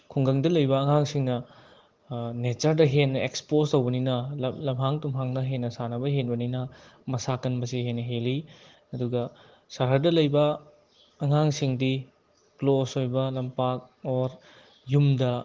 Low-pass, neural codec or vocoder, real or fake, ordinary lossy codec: 7.2 kHz; none; real; Opus, 16 kbps